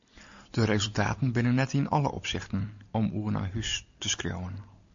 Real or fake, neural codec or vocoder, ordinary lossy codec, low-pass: real; none; MP3, 64 kbps; 7.2 kHz